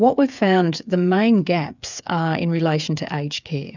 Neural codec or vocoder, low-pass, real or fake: codec, 16 kHz, 8 kbps, FreqCodec, smaller model; 7.2 kHz; fake